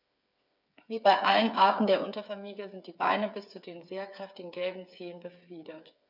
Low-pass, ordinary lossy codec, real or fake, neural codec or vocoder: 5.4 kHz; none; fake; codec, 16 kHz, 8 kbps, FreqCodec, smaller model